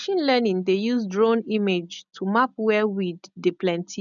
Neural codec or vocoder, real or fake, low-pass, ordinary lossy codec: none; real; 7.2 kHz; none